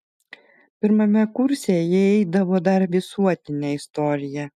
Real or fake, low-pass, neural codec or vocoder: real; 14.4 kHz; none